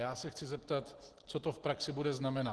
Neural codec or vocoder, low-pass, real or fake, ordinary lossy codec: none; 10.8 kHz; real; Opus, 16 kbps